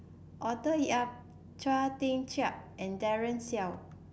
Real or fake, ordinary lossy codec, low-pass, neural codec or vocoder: real; none; none; none